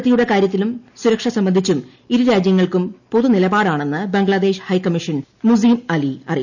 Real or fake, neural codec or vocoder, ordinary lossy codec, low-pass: real; none; none; 7.2 kHz